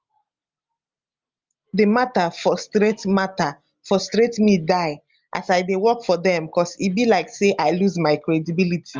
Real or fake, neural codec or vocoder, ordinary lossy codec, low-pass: real; none; Opus, 32 kbps; 7.2 kHz